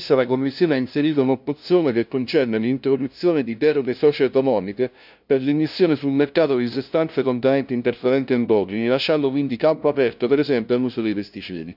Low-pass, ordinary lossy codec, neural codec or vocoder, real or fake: 5.4 kHz; none; codec, 16 kHz, 0.5 kbps, FunCodec, trained on LibriTTS, 25 frames a second; fake